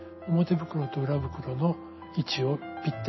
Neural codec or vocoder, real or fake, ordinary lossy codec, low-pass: none; real; MP3, 24 kbps; 7.2 kHz